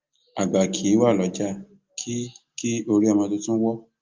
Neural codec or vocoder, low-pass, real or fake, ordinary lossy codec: none; 7.2 kHz; real; Opus, 24 kbps